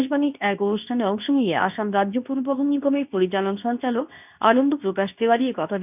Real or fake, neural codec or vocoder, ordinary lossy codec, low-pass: fake; codec, 24 kHz, 0.9 kbps, WavTokenizer, medium speech release version 2; none; 3.6 kHz